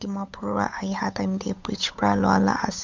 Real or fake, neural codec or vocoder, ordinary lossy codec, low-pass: fake; codec, 16 kHz, 8 kbps, FunCodec, trained on Chinese and English, 25 frames a second; MP3, 64 kbps; 7.2 kHz